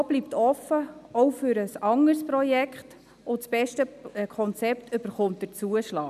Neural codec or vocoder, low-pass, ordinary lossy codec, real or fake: none; 14.4 kHz; none; real